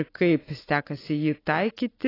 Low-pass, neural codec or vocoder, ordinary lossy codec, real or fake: 5.4 kHz; none; AAC, 24 kbps; real